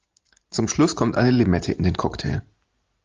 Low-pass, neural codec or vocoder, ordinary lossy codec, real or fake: 7.2 kHz; none; Opus, 32 kbps; real